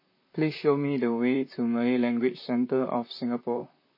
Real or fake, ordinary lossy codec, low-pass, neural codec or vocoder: fake; MP3, 24 kbps; 5.4 kHz; vocoder, 44.1 kHz, 128 mel bands, Pupu-Vocoder